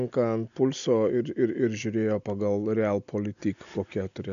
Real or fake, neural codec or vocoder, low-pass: real; none; 7.2 kHz